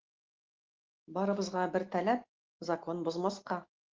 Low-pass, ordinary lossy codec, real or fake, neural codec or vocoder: 7.2 kHz; Opus, 16 kbps; real; none